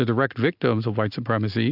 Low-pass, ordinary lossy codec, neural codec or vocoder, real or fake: 5.4 kHz; AAC, 48 kbps; none; real